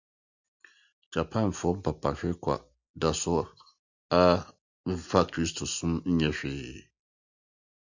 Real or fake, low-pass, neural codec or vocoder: real; 7.2 kHz; none